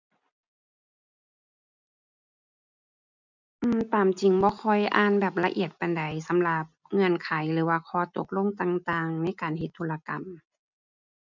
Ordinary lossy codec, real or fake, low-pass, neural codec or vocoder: none; real; 7.2 kHz; none